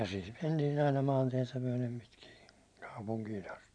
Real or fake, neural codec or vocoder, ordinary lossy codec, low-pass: fake; vocoder, 44.1 kHz, 128 mel bands every 512 samples, BigVGAN v2; none; 9.9 kHz